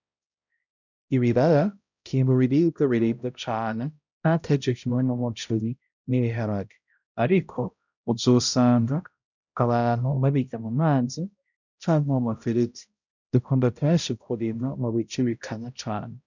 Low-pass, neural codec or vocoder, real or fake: 7.2 kHz; codec, 16 kHz, 0.5 kbps, X-Codec, HuBERT features, trained on balanced general audio; fake